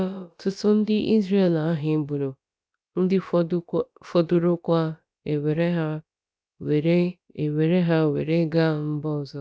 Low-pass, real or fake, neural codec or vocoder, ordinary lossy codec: none; fake; codec, 16 kHz, about 1 kbps, DyCAST, with the encoder's durations; none